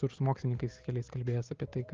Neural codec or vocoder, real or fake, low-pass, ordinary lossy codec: none; real; 7.2 kHz; Opus, 32 kbps